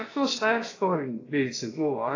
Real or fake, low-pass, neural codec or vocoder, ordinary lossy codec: fake; 7.2 kHz; codec, 16 kHz, about 1 kbps, DyCAST, with the encoder's durations; AAC, 32 kbps